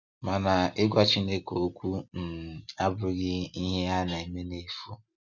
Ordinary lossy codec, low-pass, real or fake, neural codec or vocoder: none; 7.2 kHz; real; none